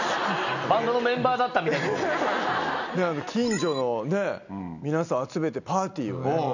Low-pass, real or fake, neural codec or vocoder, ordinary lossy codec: 7.2 kHz; real; none; none